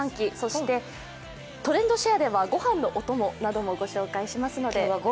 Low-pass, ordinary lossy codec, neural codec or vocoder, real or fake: none; none; none; real